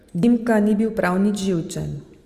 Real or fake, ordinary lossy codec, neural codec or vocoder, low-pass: real; Opus, 32 kbps; none; 14.4 kHz